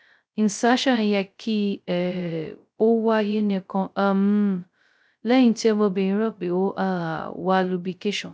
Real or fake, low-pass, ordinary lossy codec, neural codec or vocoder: fake; none; none; codec, 16 kHz, 0.2 kbps, FocalCodec